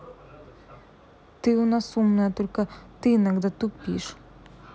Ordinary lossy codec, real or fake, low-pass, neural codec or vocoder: none; real; none; none